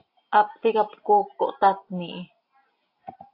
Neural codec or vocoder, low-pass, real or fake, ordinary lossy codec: none; 5.4 kHz; real; AAC, 32 kbps